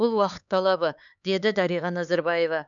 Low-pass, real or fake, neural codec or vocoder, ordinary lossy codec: 7.2 kHz; fake; codec, 16 kHz, 4 kbps, X-Codec, HuBERT features, trained on LibriSpeech; none